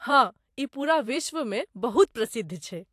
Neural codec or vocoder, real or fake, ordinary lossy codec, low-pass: vocoder, 44.1 kHz, 128 mel bands every 512 samples, BigVGAN v2; fake; AAC, 64 kbps; 14.4 kHz